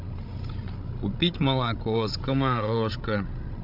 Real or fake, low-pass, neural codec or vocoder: fake; 5.4 kHz; codec, 16 kHz, 16 kbps, FreqCodec, larger model